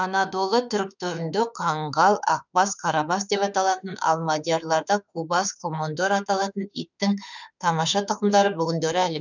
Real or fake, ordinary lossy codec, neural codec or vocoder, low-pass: fake; none; autoencoder, 48 kHz, 32 numbers a frame, DAC-VAE, trained on Japanese speech; 7.2 kHz